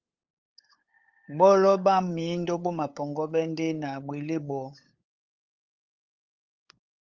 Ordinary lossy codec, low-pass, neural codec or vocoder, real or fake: Opus, 32 kbps; 7.2 kHz; codec, 16 kHz, 8 kbps, FunCodec, trained on LibriTTS, 25 frames a second; fake